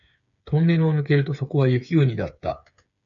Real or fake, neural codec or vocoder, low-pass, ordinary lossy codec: fake; codec, 16 kHz, 8 kbps, FreqCodec, smaller model; 7.2 kHz; MP3, 96 kbps